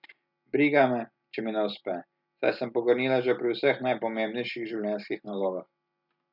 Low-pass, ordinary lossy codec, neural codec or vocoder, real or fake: 5.4 kHz; none; none; real